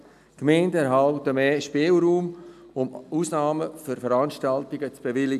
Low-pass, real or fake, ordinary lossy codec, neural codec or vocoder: 14.4 kHz; real; none; none